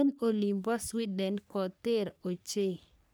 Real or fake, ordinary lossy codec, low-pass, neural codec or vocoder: fake; none; none; codec, 44.1 kHz, 3.4 kbps, Pupu-Codec